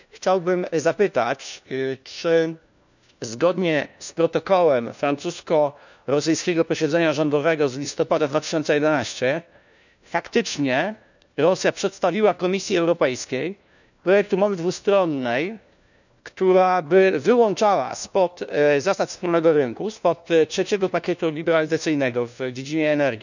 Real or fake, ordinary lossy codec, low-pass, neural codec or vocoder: fake; none; 7.2 kHz; codec, 16 kHz, 1 kbps, FunCodec, trained on LibriTTS, 50 frames a second